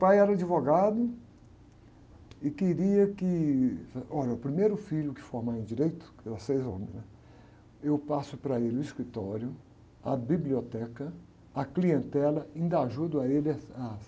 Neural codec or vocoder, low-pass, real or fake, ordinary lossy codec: none; none; real; none